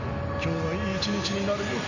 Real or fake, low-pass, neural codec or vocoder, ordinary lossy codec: real; 7.2 kHz; none; none